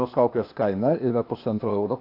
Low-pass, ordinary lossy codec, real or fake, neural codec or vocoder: 5.4 kHz; AAC, 32 kbps; fake; codec, 16 kHz, 0.8 kbps, ZipCodec